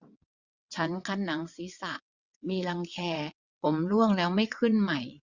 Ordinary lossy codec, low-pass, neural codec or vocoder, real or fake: Opus, 64 kbps; 7.2 kHz; vocoder, 22.05 kHz, 80 mel bands, WaveNeXt; fake